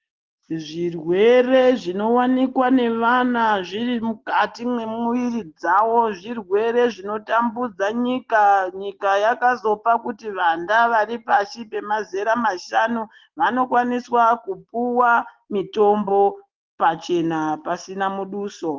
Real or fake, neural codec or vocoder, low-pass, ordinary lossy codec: real; none; 7.2 kHz; Opus, 16 kbps